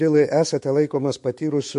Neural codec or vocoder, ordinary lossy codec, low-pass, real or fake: autoencoder, 48 kHz, 128 numbers a frame, DAC-VAE, trained on Japanese speech; MP3, 48 kbps; 14.4 kHz; fake